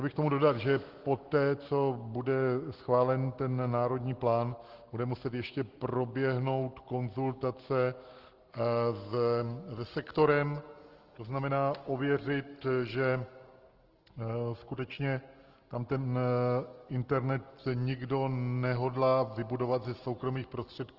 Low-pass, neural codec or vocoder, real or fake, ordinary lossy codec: 5.4 kHz; none; real; Opus, 16 kbps